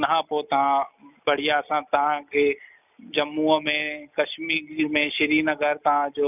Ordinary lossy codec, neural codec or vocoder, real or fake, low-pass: none; none; real; 3.6 kHz